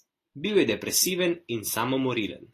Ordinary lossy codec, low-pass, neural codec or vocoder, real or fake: AAC, 48 kbps; 14.4 kHz; none; real